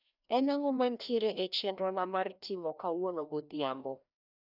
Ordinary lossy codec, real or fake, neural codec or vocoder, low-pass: none; fake; codec, 16 kHz, 1 kbps, FreqCodec, larger model; 5.4 kHz